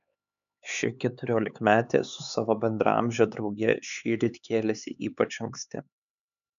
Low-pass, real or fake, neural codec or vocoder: 7.2 kHz; fake; codec, 16 kHz, 4 kbps, X-Codec, HuBERT features, trained on LibriSpeech